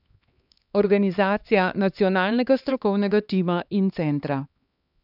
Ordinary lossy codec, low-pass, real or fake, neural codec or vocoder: none; 5.4 kHz; fake; codec, 16 kHz, 2 kbps, X-Codec, HuBERT features, trained on LibriSpeech